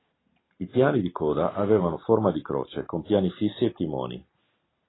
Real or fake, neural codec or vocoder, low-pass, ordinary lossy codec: real; none; 7.2 kHz; AAC, 16 kbps